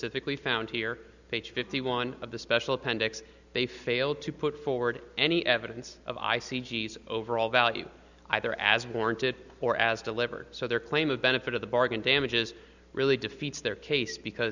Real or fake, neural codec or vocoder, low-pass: real; none; 7.2 kHz